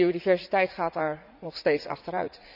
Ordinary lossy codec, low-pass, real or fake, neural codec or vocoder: none; 5.4 kHz; fake; vocoder, 44.1 kHz, 80 mel bands, Vocos